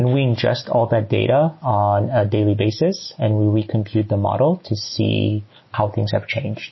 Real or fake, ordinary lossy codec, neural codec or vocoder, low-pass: real; MP3, 24 kbps; none; 7.2 kHz